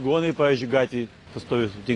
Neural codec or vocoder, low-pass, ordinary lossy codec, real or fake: none; 10.8 kHz; AAC, 32 kbps; real